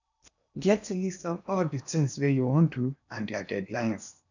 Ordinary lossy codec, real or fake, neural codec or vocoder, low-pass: none; fake; codec, 16 kHz in and 24 kHz out, 0.8 kbps, FocalCodec, streaming, 65536 codes; 7.2 kHz